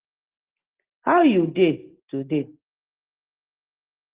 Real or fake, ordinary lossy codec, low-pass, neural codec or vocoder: real; Opus, 16 kbps; 3.6 kHz; none